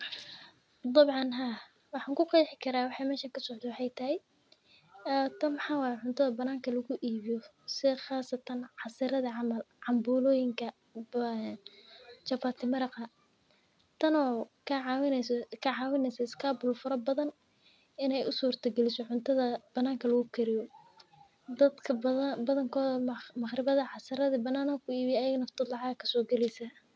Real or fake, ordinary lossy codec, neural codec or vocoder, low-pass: real; none; none; none